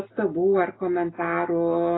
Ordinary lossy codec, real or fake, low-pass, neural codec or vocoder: AAC, 16 kbps; real; 7.2 kHz; none